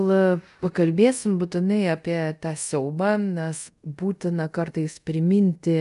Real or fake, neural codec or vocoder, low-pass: fake; codec, 24 kHz, 0.5 kbps, DualCodec; 10.8 kHz